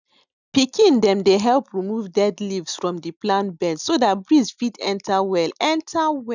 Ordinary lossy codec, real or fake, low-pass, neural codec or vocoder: none; real; 7.2 kHz; none